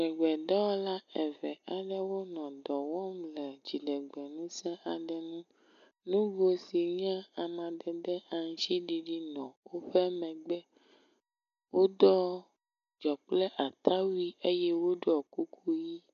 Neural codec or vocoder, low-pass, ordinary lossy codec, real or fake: none; 7.2 kHz; AAC, 96 kbps; real